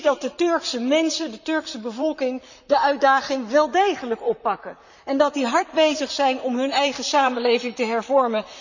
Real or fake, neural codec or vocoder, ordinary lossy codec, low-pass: fake; vocoder, 44.1 kHz, 128 mel bands, Pupu-Vocoder; none; 7.2 kHz